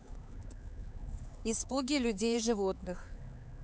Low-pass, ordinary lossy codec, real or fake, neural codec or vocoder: none; none; fake; codec, 16 kHz, 4 kbps, X-Codec, HuBERT features, trained on LibriSpeech